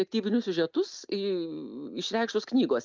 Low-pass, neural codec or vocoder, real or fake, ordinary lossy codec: 7.2 kHz; none; real; Opus, 24 kbps